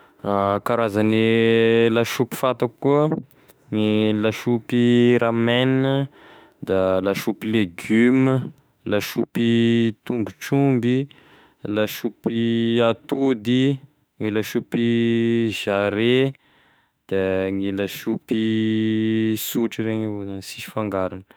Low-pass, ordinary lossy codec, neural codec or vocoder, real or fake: none; none; autoencoder, 48 kHz, 32 numbers a frame, DAC-VAE, trained on Japanese speech; fake